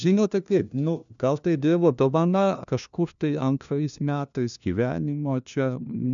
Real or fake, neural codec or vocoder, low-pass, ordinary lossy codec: fake; codec, 16 kHz, 1 kbps, FunCodec, trained on LibriTTS, 50 frames a second; 7.2 kHz; MP3, 96 kbps